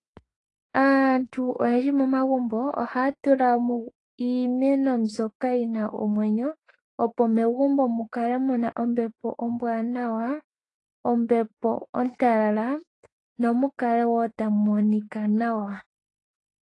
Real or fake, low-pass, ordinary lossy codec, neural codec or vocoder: fake; 10.8 kHz; AAC, 32 kbps; autoencoder, 48 kHz, 32 numbers a frame, DAC-VAE, trained on Japanese speech